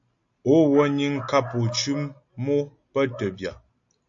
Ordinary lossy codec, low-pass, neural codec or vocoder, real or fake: AAC, 64 kbps; 7.2 kHz; none; real